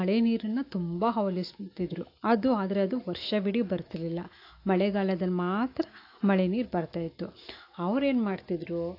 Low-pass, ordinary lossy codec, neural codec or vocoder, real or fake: 5.4 kHz; AAC, 32 kbps; autoencoder, 48 kHz, 128 numbers a frame, DAC-VAE, trained on Japanese speech; fake